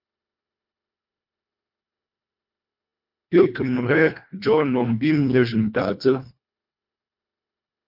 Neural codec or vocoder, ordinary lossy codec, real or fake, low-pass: codec, 24 kHz, 1.5 kbps, HILCodec; MP3, 48 kbps; fake; 5.4 kHz